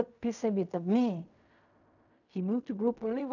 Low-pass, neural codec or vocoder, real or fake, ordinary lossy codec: 7.2 kHz; codec, 16 kHz in and 24 kHz out, 0.4 kbps, LongCat-Audio-Codec, fine tuned four codebook decoder; fake; none